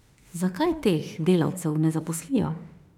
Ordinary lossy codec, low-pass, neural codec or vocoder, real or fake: none; 19.8 kHz; autoencoder, 48 kHz, 32 numbers a frame, DAC-VAE, trained on Japanese speech; fake